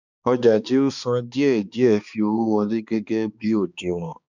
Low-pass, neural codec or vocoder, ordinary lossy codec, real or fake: 7.2 kHz; codec, 16 kHz, 2 kbps, X-Codec, HuBERT features, trained on balanced general audio; none; fake